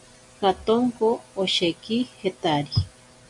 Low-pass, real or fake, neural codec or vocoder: 10.8 kHz; real; none